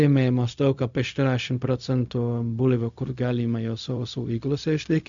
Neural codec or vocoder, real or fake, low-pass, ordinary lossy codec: codec, 16 kHz, 0.4 kbps, LongCat-Audio-Codec; fake; 7.2 kHz; MP3, 64 kbps